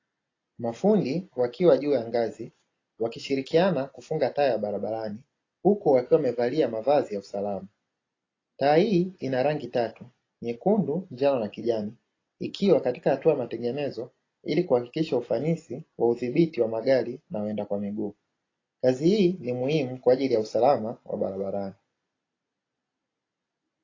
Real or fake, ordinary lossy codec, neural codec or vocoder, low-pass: real; AAC, 32 kbps; none; 7.2 kHz